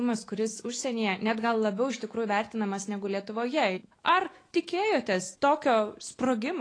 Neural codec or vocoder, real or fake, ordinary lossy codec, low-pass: autoencoder, 48 kHz, 128 numbers a frame, DAC-VAE, trained on Japanese speech; fake; AAC, 32 kbps; 9.9 kHz